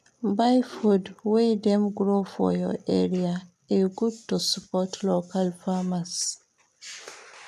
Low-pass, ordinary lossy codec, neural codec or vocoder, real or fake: 14.4 kHz; none; none; real